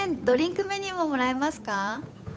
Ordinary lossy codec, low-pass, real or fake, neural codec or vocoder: none; none; fake; codec, 16 kHz, 8 kbps, FunCodec, trained on Chinese and English, 25 frames a second